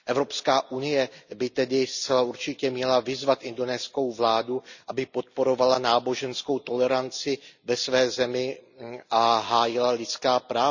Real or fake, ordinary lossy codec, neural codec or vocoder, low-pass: real; none; none; 7.2 kHz